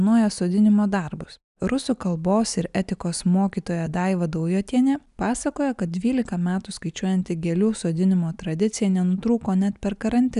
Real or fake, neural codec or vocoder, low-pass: real; none; 10.8 kHz